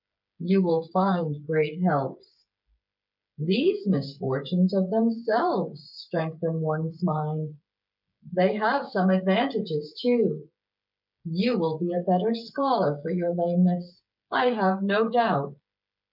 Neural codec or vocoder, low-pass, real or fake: codec, 16 kHz, 8 kbps, FreqCodec, smaller model; 5.4 kHz; fake